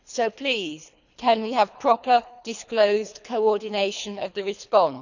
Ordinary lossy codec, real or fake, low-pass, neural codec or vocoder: none; fake; 7.2 kHz; codec, 24 kHz, 3 kbps, HILCodec